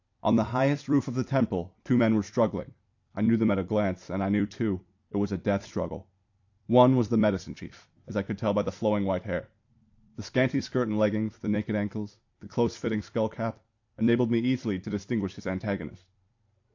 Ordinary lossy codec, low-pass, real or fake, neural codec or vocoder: AAC, 48 kbps; 7.2 kHz; fake; vocoder, 44.1 kHz, 128 mel bands every 256 samples, BigVGAN v2